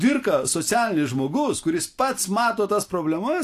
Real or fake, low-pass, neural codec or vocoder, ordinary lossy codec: real; 14.4 kHz; none; AAC, 64 kbps